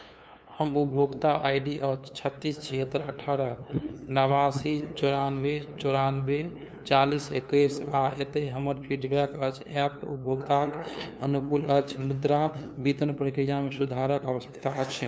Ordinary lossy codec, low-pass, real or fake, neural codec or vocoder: none; none; fake; codec, 16 kHz, 2 kbps, FunCodec, trained on LibriTTS, 25 frames a second